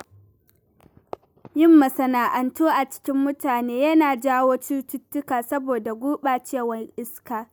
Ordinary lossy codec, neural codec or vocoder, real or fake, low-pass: none; none; real; none